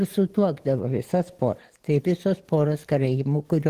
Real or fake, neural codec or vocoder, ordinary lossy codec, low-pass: fake; codec, 44.1 kHz, 7.8 kbps, DAC; Opus, 16 kbps; 14.4 kHz